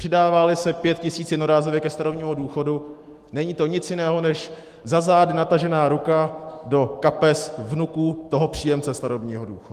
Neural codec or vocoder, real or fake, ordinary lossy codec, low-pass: autoencoder, 48 kHz, 128 numbers a frame, DAC-VAE, trained on Japanese speech; fake; Opus, 24 kbps; 14.4 kHz